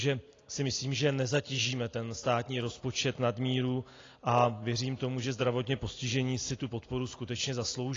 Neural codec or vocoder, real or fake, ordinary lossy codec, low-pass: none; real; AAC, 32 kbps; 7.2 kHz